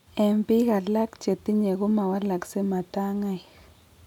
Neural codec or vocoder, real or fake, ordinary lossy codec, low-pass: none; real; none; 19.8 kHz